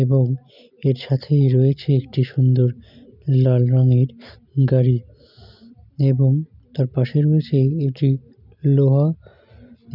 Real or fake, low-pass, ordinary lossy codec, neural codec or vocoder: real; 5.4 kHz; none; none